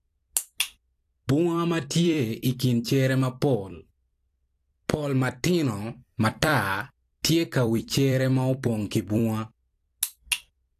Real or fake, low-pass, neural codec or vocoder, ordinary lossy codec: fake; 14.4 kHz; vocoder, 44.1 kHz, 128 mel bands every 256 samples, BigVGAN v2; AAC, 64 kbps